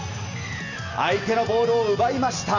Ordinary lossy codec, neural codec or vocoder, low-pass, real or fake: none; none; 7.2 kHz; real